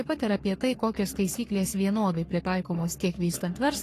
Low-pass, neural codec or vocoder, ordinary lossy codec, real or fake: 14.4 kHz; codec, 44.1 kHz, 3.4 kbps, Pupu-Codec; AAC, 48 kbps; fake